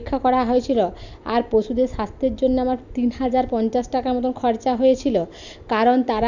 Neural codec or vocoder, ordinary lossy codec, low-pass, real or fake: none; none; 7.2 kHz; real